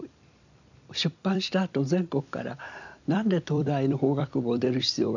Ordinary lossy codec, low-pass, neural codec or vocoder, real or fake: none; 7.2 kHz; vocoder, 44.1 kHz, 128 mel bands every 256 samples, BigVGAN v2; fake